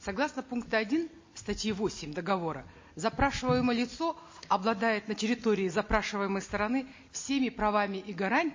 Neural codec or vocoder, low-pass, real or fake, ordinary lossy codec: none; 7.2 kHz; real; MP3, 32 kbps